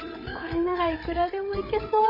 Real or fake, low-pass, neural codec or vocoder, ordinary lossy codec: fake; 5.4 kHz; codec, 16 kHz, 8 kbps, FreqCodec, larger model; MP3, 24 kbps